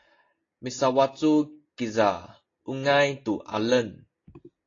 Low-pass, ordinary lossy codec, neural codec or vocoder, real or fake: 7.2 kHz; AAC, 32 kbps; none; real